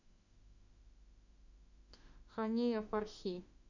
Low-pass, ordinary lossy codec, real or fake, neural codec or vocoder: 7.2 kHz; none; fake; autoencoder, 48 kHz, 32 numbers a frame, DAC-VAE, trained on Japanese speech